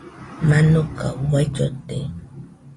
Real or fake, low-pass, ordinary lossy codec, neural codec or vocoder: real; 10.8 kHz; AAC, 32 kbps; none